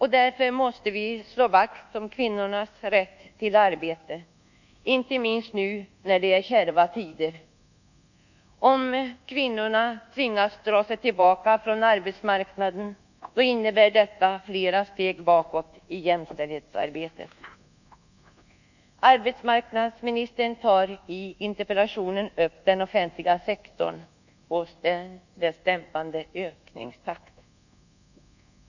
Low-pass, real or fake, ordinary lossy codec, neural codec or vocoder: 7.2 kHz; fake; none; codec, 24 kHz, 1.2 kbps, DualCodec